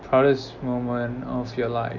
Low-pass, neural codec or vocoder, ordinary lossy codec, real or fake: 7.2 kHz; none; none; real